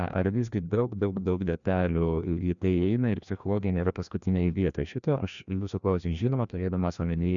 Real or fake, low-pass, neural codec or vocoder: fake; 7.2 kHz; codec, 16 kHz, 1 kbps, FreqCodec, larger model